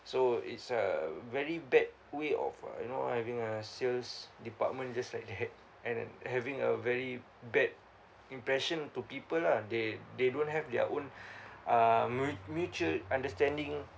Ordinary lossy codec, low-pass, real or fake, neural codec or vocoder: none; none; real; none